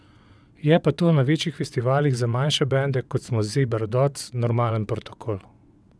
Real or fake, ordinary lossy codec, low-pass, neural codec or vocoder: fake; none; none; vocoder, 22.05 kHz, 80 mel bands, WaveNeXt